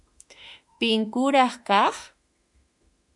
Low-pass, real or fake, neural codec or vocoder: 10.8 kHz; fake; autoencoder, 48 kHz, 32 numbers a frame, DAC-VAE, trained on Japanese speech